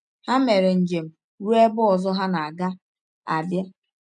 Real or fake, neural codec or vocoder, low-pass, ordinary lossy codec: real; none; 9.9 kHz; none